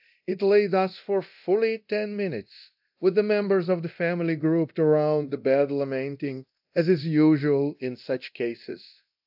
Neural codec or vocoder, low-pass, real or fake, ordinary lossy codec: codec, 24 kHz, 0.9 kbps, DualCodec; 5.4 kHz; fake; AAC, 48 kbps